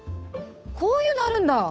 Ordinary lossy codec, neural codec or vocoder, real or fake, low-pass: none; codec, 16 kHz, 8 kbps, FunCodec, trained on Chinese and English, 25 frames a second; fake; none